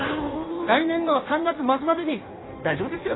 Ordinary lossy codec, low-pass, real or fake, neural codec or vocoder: AAC, 16 kbps; 7.2 kHz; fake; codec, 16 kHz in and 24 kHz out, 1.1 kbps, FireRedTTS-2 codec